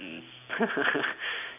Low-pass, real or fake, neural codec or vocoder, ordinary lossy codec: 3.6 kHz; real; none; none